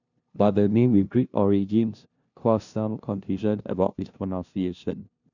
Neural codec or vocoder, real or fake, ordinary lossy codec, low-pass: codec, 16 kHz, 0.5 kbps, FunCodec, trained on LibriTTS, 25 frames a second; fake; none; 7.2 kHz